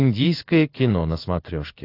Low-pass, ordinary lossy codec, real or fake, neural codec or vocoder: 5.4 kHz; AAC, 32 kbps; fake; codec, 16 kHz in and 24 kHz out, 1 kbps, XY-Tokenizer